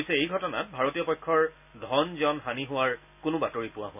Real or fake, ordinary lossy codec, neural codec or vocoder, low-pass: real; none; none; 3.6 kHz